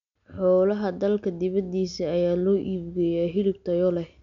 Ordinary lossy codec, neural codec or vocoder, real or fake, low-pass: none; none; real; 7.2 kHz